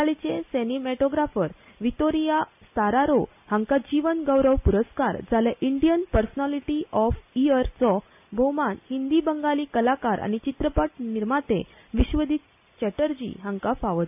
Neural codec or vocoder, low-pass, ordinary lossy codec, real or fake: none; 3.6 kHz; AAC, 32 kbps; real